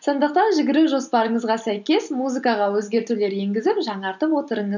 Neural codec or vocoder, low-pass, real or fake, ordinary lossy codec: none; 7.2 kHz; real; none